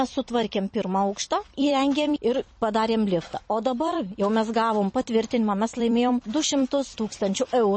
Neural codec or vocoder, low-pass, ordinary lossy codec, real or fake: vocoder, 44.1 kHz, 128 mel bands every 256 samples, BigVGAN v2; 10.8 kHz; MP3, 32 kbps; fake